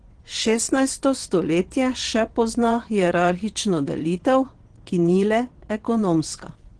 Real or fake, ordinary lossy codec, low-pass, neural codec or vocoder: fake; Opus, 16 kbps; 9.9 kHz; vocoder, 22.05 kHz, 80 mel bands, WaveNeXt